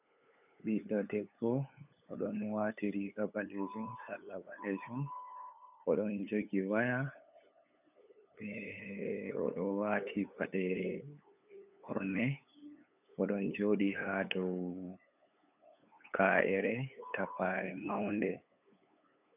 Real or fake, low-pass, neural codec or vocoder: fake; 3.6 kHz; codec, 16 kHz, 8 kbps, FunCodec, trained on LibriTTS, 25 frames a second